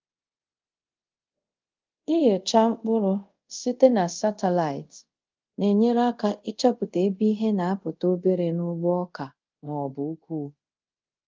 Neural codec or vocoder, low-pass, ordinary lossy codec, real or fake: codec, 24 kHz, 0.5 kbps, DualCodec; 7.2 kHz; Opus, 32 kbps; fake